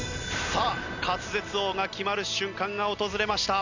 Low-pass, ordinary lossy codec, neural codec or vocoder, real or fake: 7.2 kHz; none; none; real